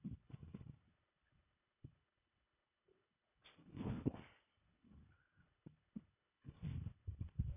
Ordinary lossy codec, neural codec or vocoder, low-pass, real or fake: none; codec, 24 kHz, 1.5 kbps, HILCodec; 3.6 kHz; fake